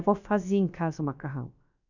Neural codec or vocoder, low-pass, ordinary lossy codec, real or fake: codec, 16 kHz, about 1 kbps, DyCAST, with the encoder's durations; 7.2 kHz; none; fake